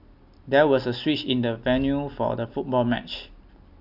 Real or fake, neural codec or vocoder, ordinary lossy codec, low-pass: real; none; none; 5.4 kHz